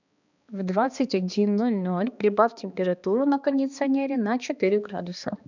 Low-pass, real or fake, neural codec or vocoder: 7.2 kHz; fake; codec, 16 kHz, 2 kbps, X-Codec, HuBERT features, trained on balanced general audio